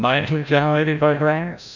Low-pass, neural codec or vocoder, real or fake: 7.2 kHz; codec, 16 kHz, 0.5 kbps, FreqCodec, larger model; fake